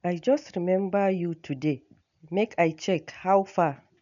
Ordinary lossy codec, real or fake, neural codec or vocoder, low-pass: none; real; none; 7.2 kHz